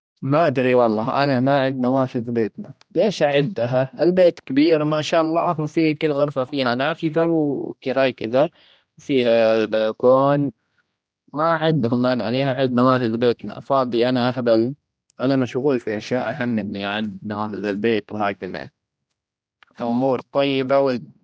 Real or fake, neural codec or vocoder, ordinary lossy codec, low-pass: fake; codec, 16 kHz, 1 kbps, X-Codec, HuBERT features, trained on general audio; none; none